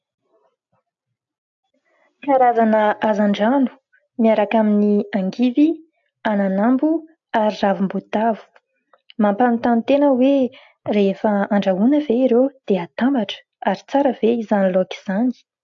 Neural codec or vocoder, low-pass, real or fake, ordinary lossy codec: none; 7.2 kHz; real; AAC, 64 kbps